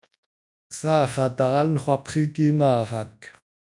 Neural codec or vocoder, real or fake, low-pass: codec, 24 kHz, 0.9 kbps, WavTokenizer, large speech release; fake; 10.8 kHz